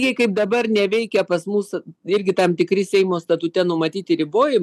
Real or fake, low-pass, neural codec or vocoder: real; 14.4 kHz; none